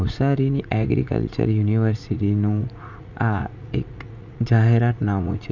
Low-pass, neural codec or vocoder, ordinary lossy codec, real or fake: 7.2 kHz; vocoder, 44.1 kHz, 80 mel bands, Vocos; none; fake